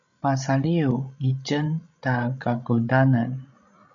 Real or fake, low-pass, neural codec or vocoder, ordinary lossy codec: fake; 7.2 kHz; codec, 16 kHz, 16 kbps, FreqCodec, larger model; MP3, 96 kbps